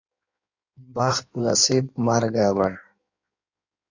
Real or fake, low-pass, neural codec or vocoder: fake; 7.2 kHz; codec, 16 kHz in and 24 kHz out, 1.1 kbps, FireRedTTS-2 codec